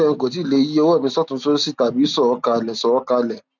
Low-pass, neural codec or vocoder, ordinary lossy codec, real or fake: 7.2 kHz; none; none; real